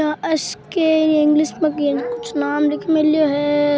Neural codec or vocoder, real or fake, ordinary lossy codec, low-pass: none; real; none; none